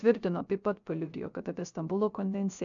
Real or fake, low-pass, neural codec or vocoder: fake; 7.2 kHz; codec, 16 kHz, 0.3 kbps, FocalCodec